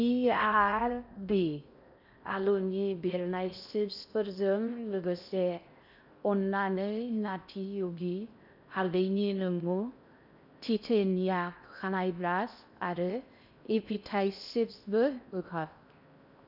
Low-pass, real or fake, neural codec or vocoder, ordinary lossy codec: 5.4 kHz; fake; codec, 16 kHz in and 24 kHz out, 0.6 kbps, FocalCodec, streaming, 4096 codes; none